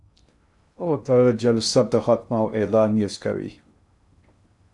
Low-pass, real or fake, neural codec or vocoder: 10.8 kHz; fake; codec, 16 kHz in and 24 kHz out, 0.6 kbps, FocalCodec, streaming, 2048 codes